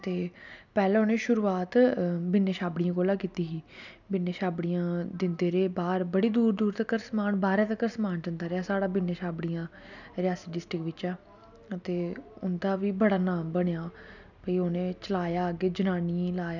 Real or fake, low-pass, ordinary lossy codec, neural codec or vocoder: real; 7.2 kHz; none; none